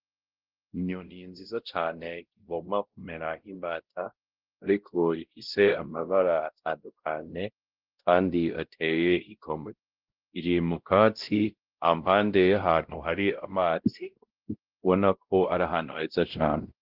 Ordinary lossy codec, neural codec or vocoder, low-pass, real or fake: Opus, 16 kbps; codec, 16 kHz, 0.5 kbps, X-Codec, WavLM features, trained on Multilingual LibriSpeech; 5.4 kHz; fake